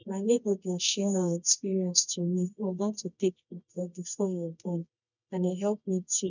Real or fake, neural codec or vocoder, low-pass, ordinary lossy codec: fake; codec, 24 kHz, 0.9 kbps, WavTokenizer, medium music audio release; 7.2 kHz; none